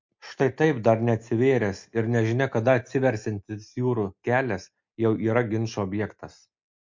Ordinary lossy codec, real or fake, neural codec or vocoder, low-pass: MP3, 64 kbps; real; none; 7.2 kHz